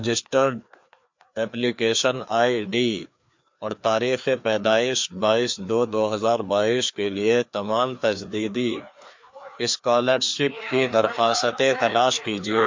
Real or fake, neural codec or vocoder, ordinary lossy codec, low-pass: fake; codec, 16 kHz, 2 kbps, FreqCodec, larger model; MP3, 48 kbps; 7.2 kHz